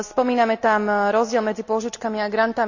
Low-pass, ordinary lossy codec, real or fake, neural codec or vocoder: 7.2 kHz; none; real; none